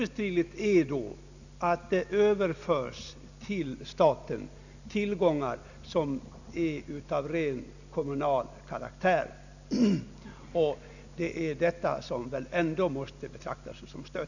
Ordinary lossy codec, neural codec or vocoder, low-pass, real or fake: none; none; 7.2 kHz; real